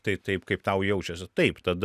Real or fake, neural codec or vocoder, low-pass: real; none; 14.4 kHz